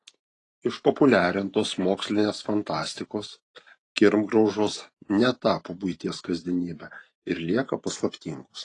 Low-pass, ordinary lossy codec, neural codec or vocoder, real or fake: 10.8 kHz; AAC, 32 kbps; vocoder, 44.1 kHz, 128 mel bands every 512 samples, BigVGAN v2; fake